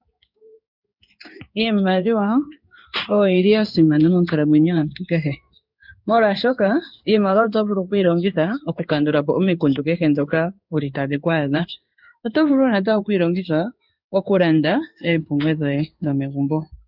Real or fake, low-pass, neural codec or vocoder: fake; 5.4 kHz; codec, 16 kHz in and 24 kHz out, 1 kbps, XY-Tokenizer